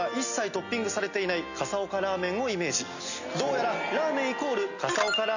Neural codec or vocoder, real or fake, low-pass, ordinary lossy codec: none; real; 7.2 kHz; none